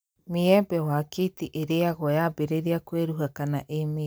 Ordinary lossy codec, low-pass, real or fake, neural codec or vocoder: none; none; real; none